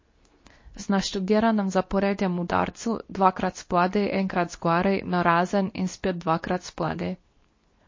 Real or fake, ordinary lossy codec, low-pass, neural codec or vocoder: fake; MP3, 32 kbps; 7.2 kHz; codec, 24 kHz, 0.9 kbps, WavTokenizer, medium speech release version 2